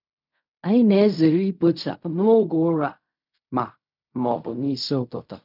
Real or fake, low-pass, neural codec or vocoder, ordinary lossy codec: fake; 5.4 kHz; codec, 16 kHz in and 24 kHz out, 0.4 kbps, LongCat-Audio-Codec, fine tuned four codebook decoder; none